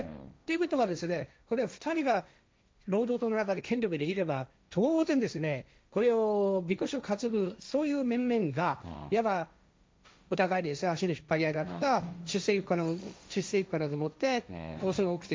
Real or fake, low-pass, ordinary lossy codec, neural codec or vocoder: fake; none; none; codec, 16 kHz, 1.1 kbps, Voila-Tokenizer